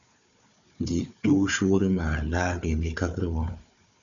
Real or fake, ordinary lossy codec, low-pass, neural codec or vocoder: fake; MP3, 96 kbps; 7.2 kHz; codec, 16 kHz, 4 kbps, FunCodec, trained on Chinese and English, 50 frames a second